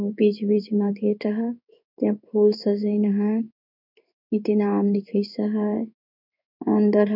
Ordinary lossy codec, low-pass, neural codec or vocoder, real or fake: none; 5.4 kHz; codec, 16 kHz in and 24 kHz out, 1 kbps, XY-Tokenizer; fake